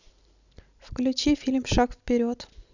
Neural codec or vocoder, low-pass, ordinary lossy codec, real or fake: none; 7.2 kHz; none; real